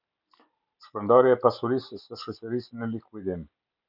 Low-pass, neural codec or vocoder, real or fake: 5.4 kHz; none; real